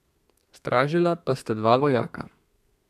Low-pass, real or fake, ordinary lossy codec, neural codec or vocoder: 14.4 kHz; fake; none; codec, 32 kHz, 1.9 kbps, SNAC